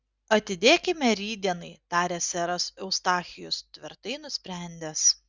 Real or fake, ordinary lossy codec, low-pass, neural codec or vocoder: real; Opus, 64 kbps; 7.2 kHz; none